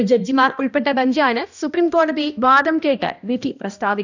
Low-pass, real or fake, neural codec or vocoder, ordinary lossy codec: 7.2 kHz; fake; codec, 16 kHz, 1 kbps, X-Codec, HuBERT features, trained on balanced general audio; none